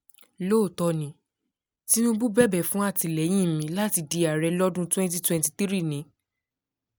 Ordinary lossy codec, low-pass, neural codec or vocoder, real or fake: none; none; none; real